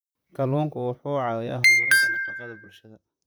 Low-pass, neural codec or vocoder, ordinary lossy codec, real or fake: none; vocoder, 44.1 kHz, 128 mel bands, Pupu-Vocoder; none; fake